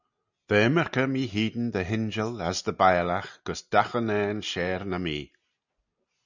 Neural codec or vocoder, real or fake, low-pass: none; real; 7.2 kHz